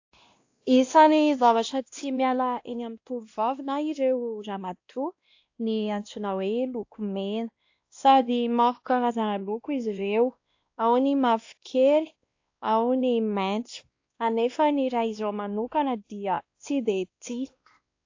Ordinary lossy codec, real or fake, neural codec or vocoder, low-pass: AAC, 48 kbps; fake; codec, 16 kHz, 1 kbps, X-Codec, WavLM features, trained on Multilingual LibriSpeech; 7.2 kHz